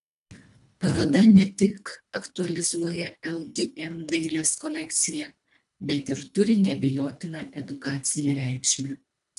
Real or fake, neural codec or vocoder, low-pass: fake; codec, 24 kHz, 1.5 kbps, HILCodec; 10.8 kHz